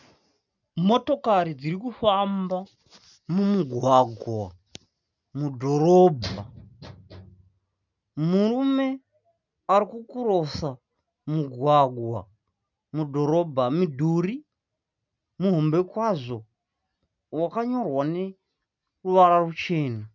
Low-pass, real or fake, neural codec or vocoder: 7.2 kHz; real; none